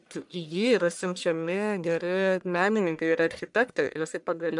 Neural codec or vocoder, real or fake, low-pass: codec, 44.1 kHz, 1.7 kbps, Pupu-Codec; fake; 10.8 kHz